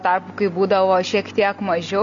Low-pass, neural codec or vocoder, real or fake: 7.2 kHz; none; real